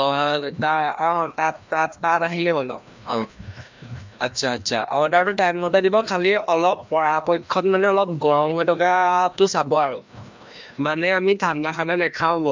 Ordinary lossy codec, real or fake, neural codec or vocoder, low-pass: MP3, 64 kbps; fake; codec, 16 kHz, 1 kbps, FreqCodec, larger model; 7.2 kHz